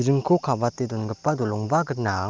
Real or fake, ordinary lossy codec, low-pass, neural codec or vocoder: real; Opus, 24 kbps; 7.2 kHz; none